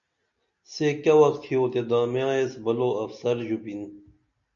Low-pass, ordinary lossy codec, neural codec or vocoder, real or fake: 7.2 kHz; MP3, 96 kbps; none; real